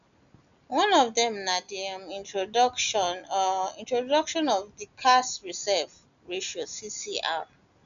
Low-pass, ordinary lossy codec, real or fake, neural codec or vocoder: 7.2 kHz; none; real; none